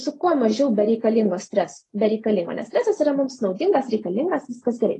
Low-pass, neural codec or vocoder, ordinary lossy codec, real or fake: 10.8 kHz; none; AAC, 32 kbps; real